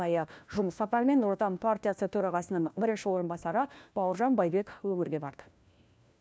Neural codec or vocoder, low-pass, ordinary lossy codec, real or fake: codec, 16 kHz, 1 kbps, FunCodec, trained on LibriTTS, 50 frames a second; none; none; fake